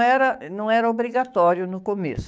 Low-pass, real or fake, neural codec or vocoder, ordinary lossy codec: none; fake; codec, 16 kHz, 6 kbps, DAC; none